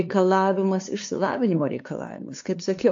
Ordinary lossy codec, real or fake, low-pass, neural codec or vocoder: AAC, 48 kbps; fake; 7.2 kHz; codec, 16 kHz, 2 kbps, FunCodec, trained on LibriTTS, 25 frames a second